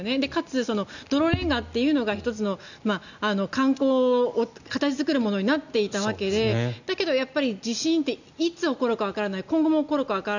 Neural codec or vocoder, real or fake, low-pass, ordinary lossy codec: none; real; 7.2 kHz; none